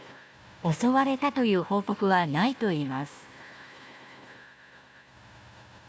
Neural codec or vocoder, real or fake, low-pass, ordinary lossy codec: codec, 16 kHz, 1 kbps, FunCodec, trained on Chinese and English, 50 frames a second; fake; none; none